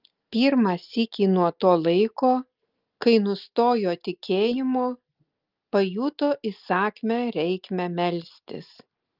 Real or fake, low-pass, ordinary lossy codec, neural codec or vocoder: real; 5.4 kHz; Opus, 32 kbps; none